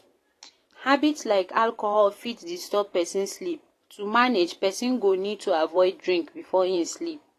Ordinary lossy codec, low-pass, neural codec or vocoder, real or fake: AAC, 48 kbps; 14.4 kHz; vocoder, 44.1 kHz, 128 mel bands every 512 samples, BigVGAN v2; fake